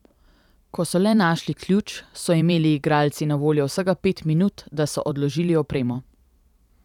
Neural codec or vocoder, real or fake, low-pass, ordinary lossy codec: vocoder, 44.1 kHz, 128 mel bands every 512 samples, BigVGAN v2; fake; 19.8 kHz; none